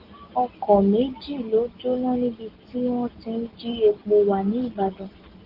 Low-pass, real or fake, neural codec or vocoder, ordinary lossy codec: 5.4 kHz; real; none; Opus, 16 kbps